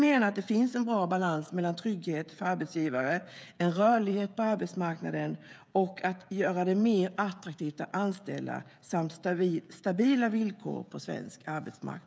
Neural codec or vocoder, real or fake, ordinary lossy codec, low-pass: codec, 16 kHz, 16 kbps, FreqCodec, smaller model; fake; none; none